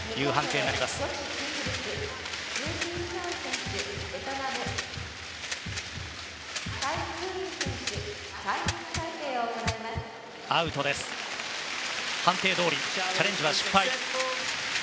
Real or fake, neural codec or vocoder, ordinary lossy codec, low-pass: real; none; none; none